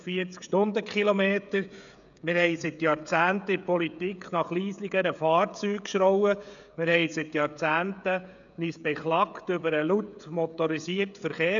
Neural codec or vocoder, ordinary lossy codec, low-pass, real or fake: codec, 16 kHz, 16 kbps, FreqCodec, smaller model; none; 7.2 kHz; fake